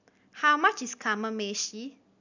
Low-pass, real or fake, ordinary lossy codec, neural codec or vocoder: 7.2 kHz; real; none; none